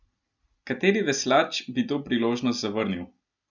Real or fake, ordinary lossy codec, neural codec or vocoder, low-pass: real; none; none; 7.2 kHz